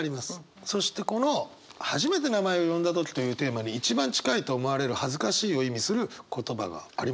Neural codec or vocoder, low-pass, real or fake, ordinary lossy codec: none; none; real; none